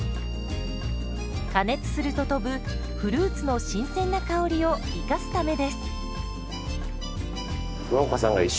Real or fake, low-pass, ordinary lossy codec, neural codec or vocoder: real; none; none; none